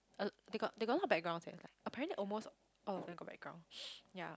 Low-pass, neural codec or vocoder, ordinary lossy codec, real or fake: none; none; none; real